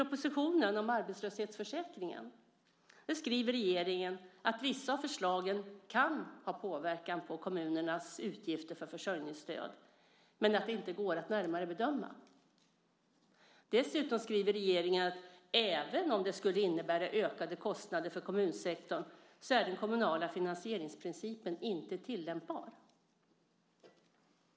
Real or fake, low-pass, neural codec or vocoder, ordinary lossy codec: real; none; none; none